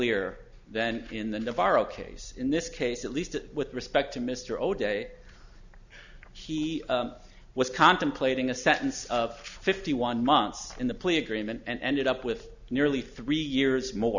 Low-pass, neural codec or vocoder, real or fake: 7.2 kHz; none; real